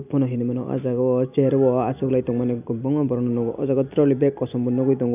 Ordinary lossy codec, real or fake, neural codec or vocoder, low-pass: none; real; none; 3.6 kHz